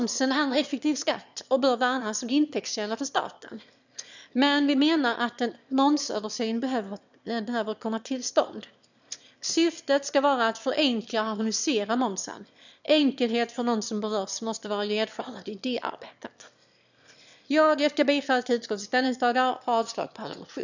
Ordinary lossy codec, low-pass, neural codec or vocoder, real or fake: none; 7.2 kHz; autoencoder, 22.05 kHz, a latent of 192 numbers a frame, VITS, trained on one speaker; fake